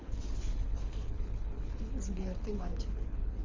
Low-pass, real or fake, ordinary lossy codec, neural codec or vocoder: 7.2 kHz; fake; Opus, 32 kbps; codec, 24 kHz, 6 kbps, HILCodec